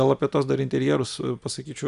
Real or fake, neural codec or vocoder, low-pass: real; none; 10.8 kHz